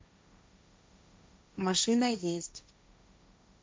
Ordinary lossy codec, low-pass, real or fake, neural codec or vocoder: none; none; fake; codec, 16 kHz, 1.1 kbps, Voila-Tokenizer